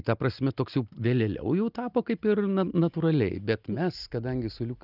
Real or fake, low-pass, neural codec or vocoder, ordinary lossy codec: real; 5.4 kHz; none; Opus, 24 kbps